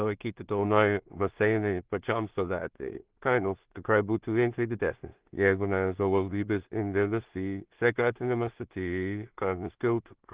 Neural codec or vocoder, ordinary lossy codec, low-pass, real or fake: codec, 16 kHz in and 24 kHz out, 0.4 kbps, LongCat-Audio-Codec, two codebook decoder; Opus, 16 kbps; 3.6 kHz; fake